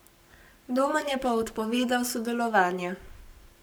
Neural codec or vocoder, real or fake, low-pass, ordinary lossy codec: codec, 44.1 kHz, 7.8 kbps, Pupu-Codec; fake; none; none